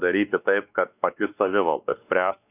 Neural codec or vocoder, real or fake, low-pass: codec, 16 kHz, 2 kbps, X-Codec, WavLM features, trained on Multilingual LibriSpeech; fake; 3.6 kHz